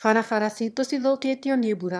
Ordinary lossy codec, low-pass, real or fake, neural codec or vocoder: none; none; fake; autoencoder, 22.05 kHz, a latent of 192 numbers a frame, VITS, trained on one speaker